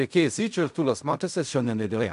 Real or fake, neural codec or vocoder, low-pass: fake; codec, 16 kHz in and 24 kHz out, 0.4 kbps, LongCat-Audio-Codec, fine tuned four codebook decoder; 10.8 kHz